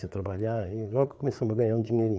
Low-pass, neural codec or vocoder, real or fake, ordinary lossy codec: none; codec, 16 kHz, 4 kbps, FreqCodec, larger model; fake; none